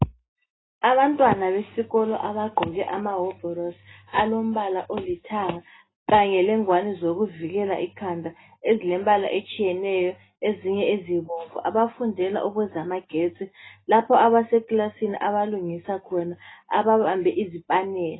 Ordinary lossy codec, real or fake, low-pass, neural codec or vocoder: AAC, 16 kbps; real; 7.2 kHz; none